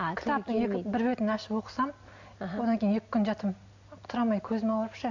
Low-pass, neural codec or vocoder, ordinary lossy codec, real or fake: 7.2 kHz; none; AAC, 48 kbps; real